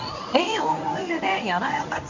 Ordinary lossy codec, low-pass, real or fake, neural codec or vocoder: none; 7.2 kHz; fake; codec, 24 kHz, 0.9 kbps, WavTokenizer, medium speech release version 1